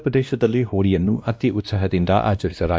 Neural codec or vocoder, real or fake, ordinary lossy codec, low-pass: codec, 16 kHz, 1 kbps, X-Codec, WavLM features, trained on Multilingual LibriSpeech; fake; none; none